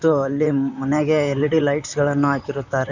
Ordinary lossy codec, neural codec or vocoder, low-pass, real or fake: none; vocoder, 44.1 kHz, 128 mel bands, Pupu-Vocoder; 7.2 kHz; fake